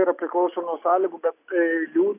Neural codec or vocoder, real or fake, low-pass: none; real; 3.6 kHz